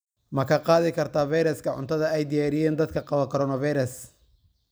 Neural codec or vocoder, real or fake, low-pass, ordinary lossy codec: vocoder, 44.1 kHz, 128 mel bands every 256 samples, BigVGAN v2; fake; none; none